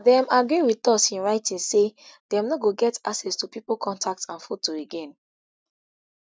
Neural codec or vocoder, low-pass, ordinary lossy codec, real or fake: none; none; none; real